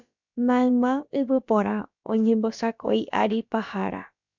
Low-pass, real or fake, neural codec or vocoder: 7.2 kHz; fake; codec, 16 kHz, about 1 kbps, DyCAST, with the encoder's durations